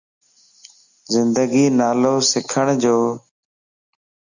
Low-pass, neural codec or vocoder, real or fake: 7.2 kHz; none; real